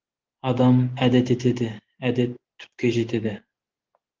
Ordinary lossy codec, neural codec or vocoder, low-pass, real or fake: Opus, 16 kbps; none; 7.2 kHz; real